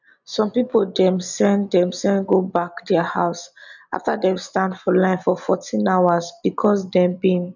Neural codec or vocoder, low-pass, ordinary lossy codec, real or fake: none; 7.2 kHz; none; real